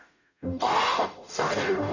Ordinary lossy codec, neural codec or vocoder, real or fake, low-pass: none; codec, 44.1 kHz, 0.9 kbps, DAC; fake; 7.2 kHz